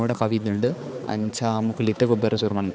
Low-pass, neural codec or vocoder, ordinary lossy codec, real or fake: none; codec, 16 kHz, 4 kbps, X-Codec, HuBERT features, trained on balanced general audio; none; fake